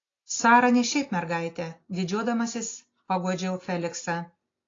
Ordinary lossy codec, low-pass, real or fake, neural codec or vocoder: AAC, 32 kbps; 7.2 kHz; real; none